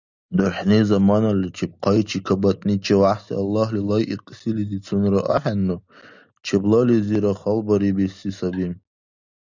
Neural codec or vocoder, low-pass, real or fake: none; 7.2 kHz; real